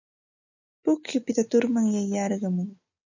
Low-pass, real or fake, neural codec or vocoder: 7.2 kHz; real; none